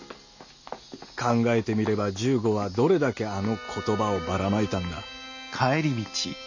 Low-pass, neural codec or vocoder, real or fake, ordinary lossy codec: 7.2 kHz; none; real; none